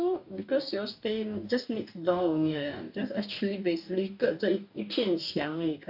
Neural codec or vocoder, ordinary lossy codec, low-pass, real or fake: codec, 44.1 kHz, 2.6 kbps, DAC; AAC, 48 kbps; 5.4 kHz; fake